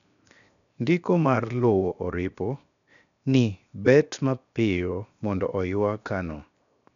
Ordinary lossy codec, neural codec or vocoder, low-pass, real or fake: none; codec, 16 kHz, 0.7 kbps, FocalCodec; 7.2 kHz; fake